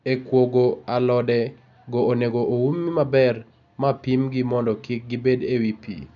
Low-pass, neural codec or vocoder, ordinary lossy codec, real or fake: 7.2 kHz; none; none; real